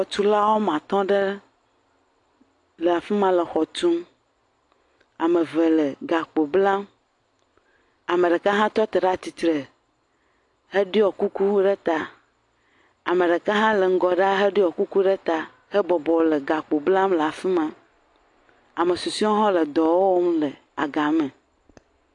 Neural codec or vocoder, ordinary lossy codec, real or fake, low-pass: none; AAC, 48 kbps; real; 10.8 kHz